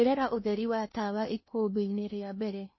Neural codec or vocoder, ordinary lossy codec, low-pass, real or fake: codec, 16 kHz in and 24 kHz out, 0.8 kbps, FocalCodec, streaming, 65536 codes; MP3, 24 kbps; 7.2 kHz; fake